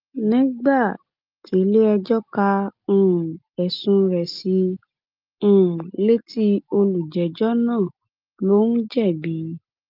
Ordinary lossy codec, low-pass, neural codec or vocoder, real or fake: Opus, 24 kbps; 5.4 kHz; none; real